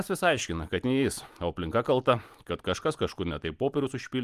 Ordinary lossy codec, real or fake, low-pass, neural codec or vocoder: Opus, 32 kbps; real; 14.4 kHz; none